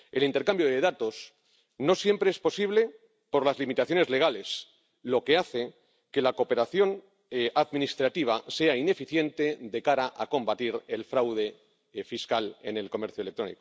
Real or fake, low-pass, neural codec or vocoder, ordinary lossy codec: real; none; none; none